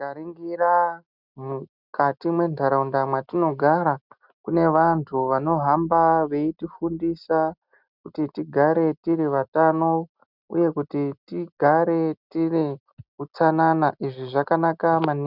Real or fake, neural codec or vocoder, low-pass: real; none; 5.4 kHz